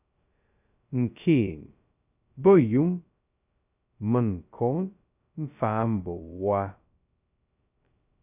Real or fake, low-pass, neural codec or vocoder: fake; 3.6 kHz; codec, 16 kHz, 0.2 kbps, FocalCodec